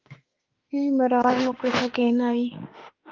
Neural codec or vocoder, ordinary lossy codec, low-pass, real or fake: autoencoder, 48 kHz, 32 numbers a frame, DAC-VAE, trained on Japanese speech; Opus, 24 kbps; 7.2 kHz; fake